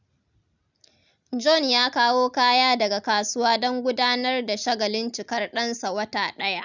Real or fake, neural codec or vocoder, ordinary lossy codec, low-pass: real; none; none; 7.2 kHz